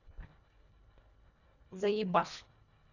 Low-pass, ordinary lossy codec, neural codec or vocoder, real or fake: 7.2 kHz; none; codec, 24 kHz, 1.5 kbps, HILCodec; fake